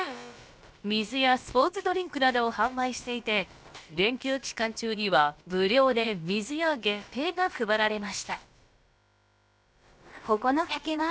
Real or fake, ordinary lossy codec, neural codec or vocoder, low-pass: fake; none; codec, 16 kHz, about 1 kbps, DyCAST, with the encoder's durations; none